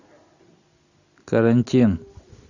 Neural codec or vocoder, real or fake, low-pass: none; real; 7.2 kHz